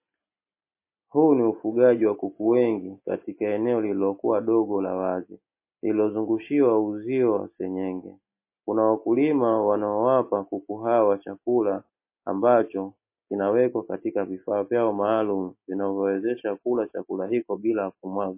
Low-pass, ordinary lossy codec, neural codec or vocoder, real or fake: 3.6 kHz; MP3, 24 kbps; none; real